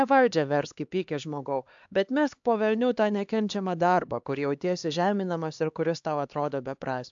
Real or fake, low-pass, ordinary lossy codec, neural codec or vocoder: fake; 7.2 kHz; AAC, 64 kbps; codec, 16 kHz, 2 kbps, X-Codec, HuBERT features, trained on LibriSpeech